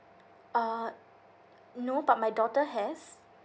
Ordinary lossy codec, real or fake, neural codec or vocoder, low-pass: none; real; none; none